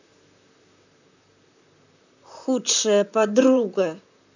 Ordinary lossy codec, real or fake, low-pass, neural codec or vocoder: none; fake; 7.2 kHz; vocoder, 44.1 kHz, 128 mel bands, Pupu-Vocoder